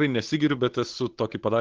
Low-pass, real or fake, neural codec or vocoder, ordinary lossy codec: 7.2 kHz; fake; codec, 16 kHz, 8 kbps, FunCodec, trained on Chinese and English, 25 frames a second; Opus, 16 kbps